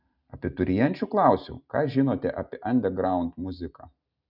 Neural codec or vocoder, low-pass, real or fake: none; 5.4 kHz; real